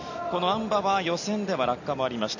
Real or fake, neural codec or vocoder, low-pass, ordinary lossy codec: real; none; 7.2 kHz; none